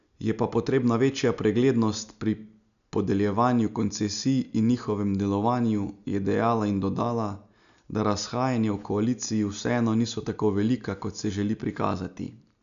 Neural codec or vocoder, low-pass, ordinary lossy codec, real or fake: none; 7.2 kHz; none; real